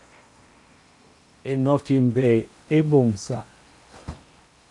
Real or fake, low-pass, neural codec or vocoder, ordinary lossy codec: fake; 10.8 kHz; codec, 16 kHz in and 24 kHz out, 0.8 kbps, FocalCodec, streaming, 65536 codes; MP3, 64 kbps